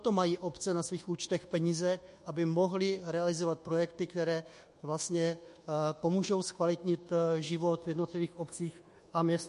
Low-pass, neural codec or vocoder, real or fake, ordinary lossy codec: 10.8 kHz; codec, 24 kHz, 1.2 kbps, DualCodec; fake; MP3, 48 kbps